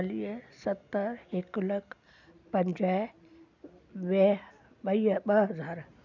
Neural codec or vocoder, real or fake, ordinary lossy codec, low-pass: none; real; none; 7.2 kHz